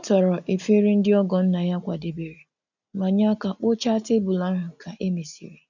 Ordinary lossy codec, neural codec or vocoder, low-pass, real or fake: none; none; 7.2 kHz; real